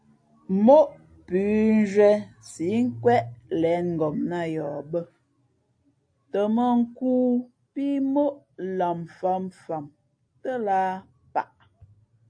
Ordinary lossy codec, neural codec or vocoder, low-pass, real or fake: AAC, 48 kbps; none; 9.9 kHz; real